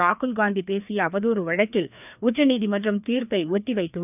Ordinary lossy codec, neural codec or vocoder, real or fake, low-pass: none; codec, 16 kHz, 2 kbps, FreqCodec, larger model; fake; 3.6 kHz